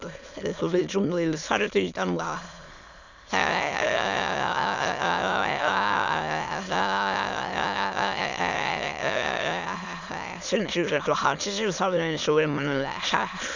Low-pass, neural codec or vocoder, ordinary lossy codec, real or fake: 7.2 kHz; autoencoder, 22.05 kHz, a latent of 192 numbers a frame, VITS, trained on many speakers; none; fake